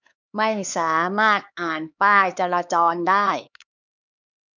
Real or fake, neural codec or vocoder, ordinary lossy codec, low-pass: fake; codec, 16 kHz, 4 kbps, X-Codec, HuBERT features, trained on LibriSpeech; none; 7.2 kHz